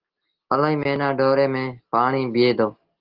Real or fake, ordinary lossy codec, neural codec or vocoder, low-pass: real; Opus, 16 kbps; none; 5.4 kHz